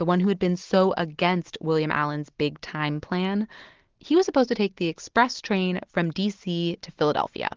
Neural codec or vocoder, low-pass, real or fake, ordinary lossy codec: none; 7.2 kHz; real; Opus, 16 kbps